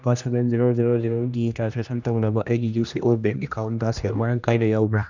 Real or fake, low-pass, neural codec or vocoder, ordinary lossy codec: fake; 7.2 kHz; codec, 16 kHz, 1 kbps, X-Codec, HuBERT features, trained on general audio; none